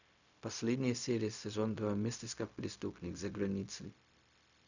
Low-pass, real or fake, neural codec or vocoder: 7.2 kHz; fake; codec, 16 kHz, 0.4 kbps, LongCat-Audio-Codec